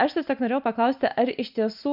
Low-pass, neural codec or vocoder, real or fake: 5.4 kHz; none; real